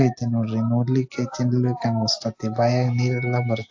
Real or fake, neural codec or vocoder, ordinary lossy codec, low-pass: real; none; AAC, 48 kbps; 7.2 kHz